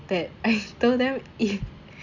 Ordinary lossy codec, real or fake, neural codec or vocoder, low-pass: none; real; none; 7.2 kHz